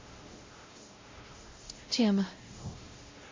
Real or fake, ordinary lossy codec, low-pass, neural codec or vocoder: fake; MP3, 32 kbps; 7.2 kHz; codec, 16 kHz, 0.5 kbps, X-Codec, WavLM features, trained on Multilingual LibriSpeech